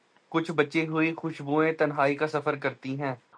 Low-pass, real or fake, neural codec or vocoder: 9.9 kHz; real; none